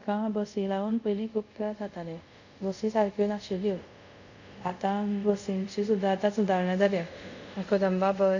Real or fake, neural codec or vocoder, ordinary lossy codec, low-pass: fake; codec, 24 kHz, 0.5 kbps, DualCodec; none; 7.2 kHz